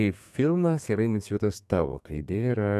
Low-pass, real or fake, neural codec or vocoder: 14.4 kHz; fake; codec, 32 kHz, 1.9 kbps, SNAC